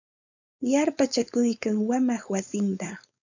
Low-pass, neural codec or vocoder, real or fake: 7.2 kHz; codec, 16 kHz, 4.8 kbps, FACodec; fake